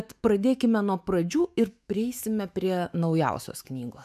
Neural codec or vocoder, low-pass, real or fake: autoencoder, 48 kHz, 128 numbers a frame, DAC-VAE, trained on Japanese speech; 14.4 kHz; fake